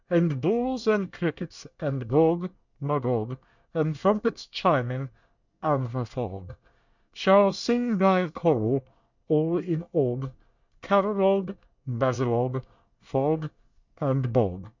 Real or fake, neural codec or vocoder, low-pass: fake; codec, 24 kHz, 1 kbps, SNAC; 7.2 kHz